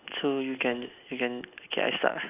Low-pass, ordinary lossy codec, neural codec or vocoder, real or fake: 3.6 kHz; none; none; real